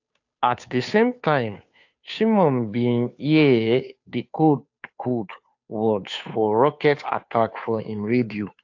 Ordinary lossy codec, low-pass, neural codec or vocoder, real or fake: AAC, 48 kbps; 7.2 kHz; codec, 16 kHz, 2 kbps, FunCodec, trained on Chinese and English, 25 frames a second; fake